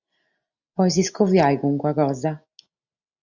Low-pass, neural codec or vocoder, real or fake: 7.2 kHz; none; real